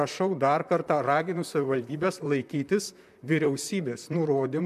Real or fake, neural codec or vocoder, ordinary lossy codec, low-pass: fake; vocoder, 44.1 kHz, 128 mel bands, Pupu-Vocoder; MP3, 96 kbps; 14.4 kHz